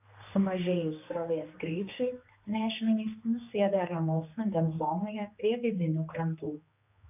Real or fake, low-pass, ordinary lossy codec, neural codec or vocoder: fake; 3.6 kHz; AAC, 32 kbps; codec, 16 kHz, 2 kbps, X-Codec, HuBERT features, trained on general audio